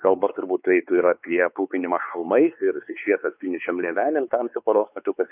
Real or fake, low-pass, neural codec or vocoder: fake; 3.6 kHz; codec, 16 kHz, 4 kbps, X-Codec, WavLM features, trained on Multilingual LibriSpeech